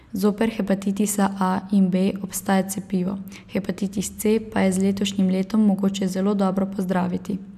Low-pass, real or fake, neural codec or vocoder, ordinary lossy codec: 14.4 kHz; real; none; none